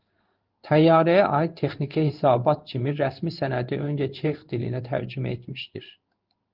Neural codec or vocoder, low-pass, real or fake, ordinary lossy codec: codec, 16 kHz in and 24 kHz out, 1 kbps, XY-Tokenizer; 5.4 kHz; fake; Opus, 32 kbps